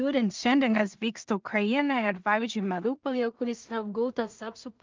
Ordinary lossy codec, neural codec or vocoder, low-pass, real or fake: Opus, 24 kbps; codec, 16 kHz in and 24 kHz out, 0.4 kbps, LongCat-Audio-Codec, two codebook decoder; 7.2 kHz; fake